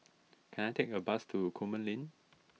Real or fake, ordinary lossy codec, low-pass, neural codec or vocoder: real; none; none; none